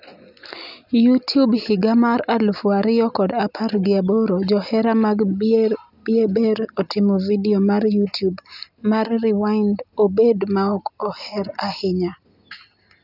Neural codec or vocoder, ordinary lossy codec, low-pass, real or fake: none; none; 5.4 kHz; real